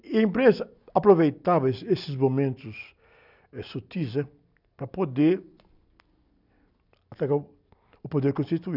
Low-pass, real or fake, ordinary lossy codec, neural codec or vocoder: 5.4 kHz; real; none; none